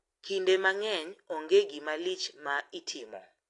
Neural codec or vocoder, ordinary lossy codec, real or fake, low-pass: none; AAC, 48 kbps; real; 10.8 kHz